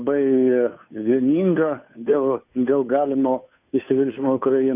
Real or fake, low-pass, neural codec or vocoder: fake; 3.6 kHz; codec, 16 kHz, 2 kbps, FunCodec, trained on Chinese and English, 25 frames a second